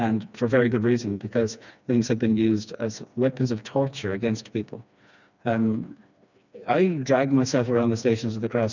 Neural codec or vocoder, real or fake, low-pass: codec, 16 kHz, 2 kbps, FreqCodec, smaller model; fake; 7.2 kHz